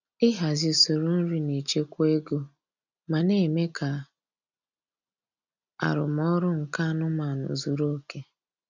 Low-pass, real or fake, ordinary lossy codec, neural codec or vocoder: 7.2 kHz; real; none; none